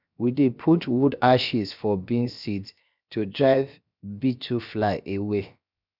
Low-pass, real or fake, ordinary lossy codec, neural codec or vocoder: 5.4 kHz; fake; none; codec, 16 kHz, 0.7 kbps, FocalCodec